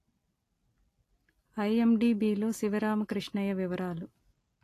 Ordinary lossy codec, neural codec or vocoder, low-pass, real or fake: AAC, 48 kbps; none; 14.4 kHz; real